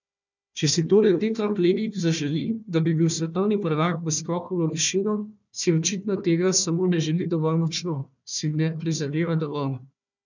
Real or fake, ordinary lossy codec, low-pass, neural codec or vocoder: fake; none; 7.2 kHz; codec, 16 kHz, 1 kbps, FunCodec, trained on Chinese and English, 50 frames a second